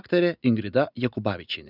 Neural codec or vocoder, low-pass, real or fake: none; 5.4 kHz; real